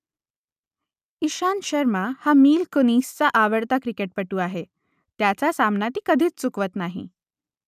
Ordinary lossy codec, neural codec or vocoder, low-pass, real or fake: none; none; 14.4 kHz; real